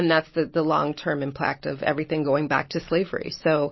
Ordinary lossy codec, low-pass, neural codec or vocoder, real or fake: MP3, 24 kbps; 7.2 kHz; none; real